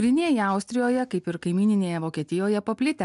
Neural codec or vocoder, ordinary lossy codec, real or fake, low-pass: none; MP3, 96 kbps; real; 10.8 kHz